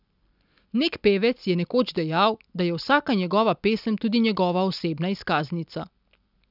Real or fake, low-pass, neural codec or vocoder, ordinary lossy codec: real; 5.4 kHz; none; none